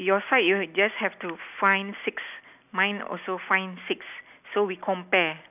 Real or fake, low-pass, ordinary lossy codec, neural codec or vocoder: real; 3.6 kHz; none; none